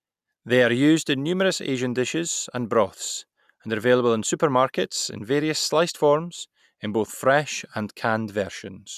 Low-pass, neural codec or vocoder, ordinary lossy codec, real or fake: 14.4 kHz; none; none; real